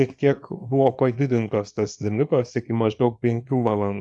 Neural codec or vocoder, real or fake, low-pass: codec, 24 kHz, 0.9 kbps, WavTokenizer, small release; fake; 10.8 kHz